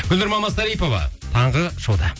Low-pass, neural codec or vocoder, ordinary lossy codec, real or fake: none; none; none; real